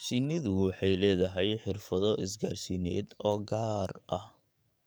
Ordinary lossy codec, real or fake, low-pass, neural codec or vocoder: none; fake; none; codec, 44.1 kHz, 7.8 kbps, DAC